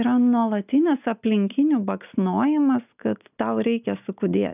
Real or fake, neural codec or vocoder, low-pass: real; none; 3.6 kHz